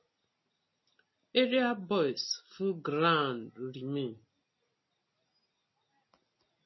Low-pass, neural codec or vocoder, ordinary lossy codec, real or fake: 7.2 kHz; none; MP3, 24 kbps; real